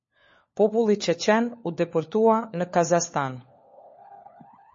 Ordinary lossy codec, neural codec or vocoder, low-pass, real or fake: MP3, 32 kbps; codec, 16 kHz, 4 kbps, FunCodec, trained on LibriTTS, 50 frames a second; 7.2 kHz; fake